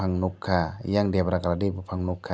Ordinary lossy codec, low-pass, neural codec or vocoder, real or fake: none; none; none; real